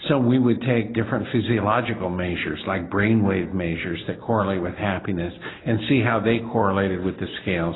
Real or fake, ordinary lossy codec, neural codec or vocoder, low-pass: real; AAC, 16 kbps; none; 7.2 kHz